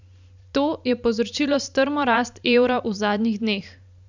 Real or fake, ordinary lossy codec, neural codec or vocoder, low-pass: fake; none; vocoder, 44.1 kHz, 128 mel bands every 512 samples, BigVGAN v2; 7.2 kHz